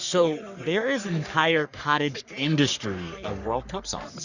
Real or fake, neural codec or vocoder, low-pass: fake; codec, 44.1 kHz, 3.4 kbps, Pupu-Codec; 7.2 kHz